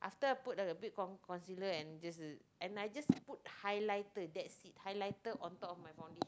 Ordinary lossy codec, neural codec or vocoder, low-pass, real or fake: none; none; none; real